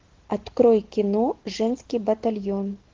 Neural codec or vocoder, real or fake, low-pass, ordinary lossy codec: none; real; 7.2 kHz; Opus, 16 kbps